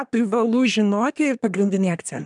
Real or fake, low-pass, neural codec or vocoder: fake; 10.8 kHz; codec, 24 kHz, 1 kbps, SNAC